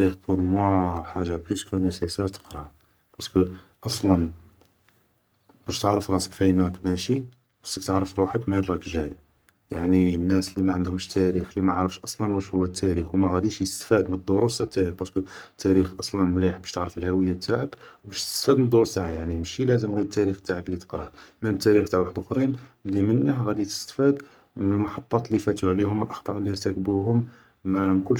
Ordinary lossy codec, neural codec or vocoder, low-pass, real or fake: none; codec, 44.1 kHz, 3.4 kbps, Pupu-Codec; none; fake